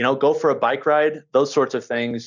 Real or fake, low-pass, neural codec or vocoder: real; 7.2 kHz; none